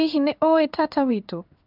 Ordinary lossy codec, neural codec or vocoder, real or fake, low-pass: none; codec, 16 kHz in and 24 kHz out, 1 kbps, XY-Tokenizer; fake; 5.4 kHz